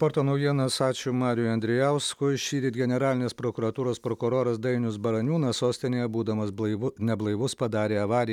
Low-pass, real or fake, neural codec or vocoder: 19.8 kHz; real; none